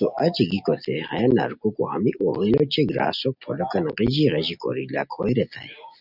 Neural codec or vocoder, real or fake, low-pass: none; real; 5.4 kHz